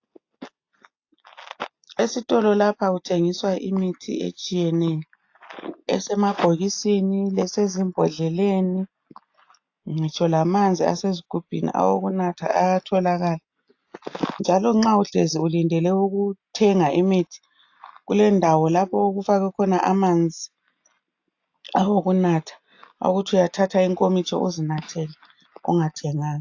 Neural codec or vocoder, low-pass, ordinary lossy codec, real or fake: none; 7.2 kHz; AAC, 48 kbps; real